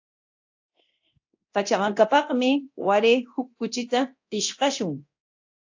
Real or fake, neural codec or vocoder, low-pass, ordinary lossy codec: fake; codec, 24 kHz, 0.9 kbps, DualCodec; 7.2 kHz; AAC, 48 kbps